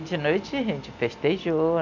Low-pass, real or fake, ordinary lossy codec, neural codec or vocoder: 7.2 kHz; real; none; none